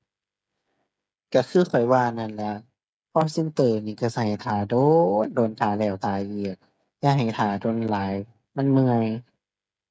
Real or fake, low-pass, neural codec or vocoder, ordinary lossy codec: fake; none; codec, 16 kHz, 8 kbps, FreqCodec, smaller model; none